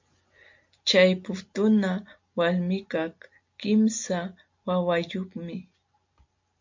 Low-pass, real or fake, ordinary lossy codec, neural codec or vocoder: 7.2 kHz; real; MP3, 48 kbps; none